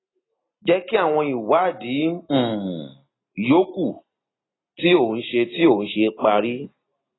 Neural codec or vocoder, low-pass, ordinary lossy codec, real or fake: none; 7.2 kHz; AAC, 16 kbps; real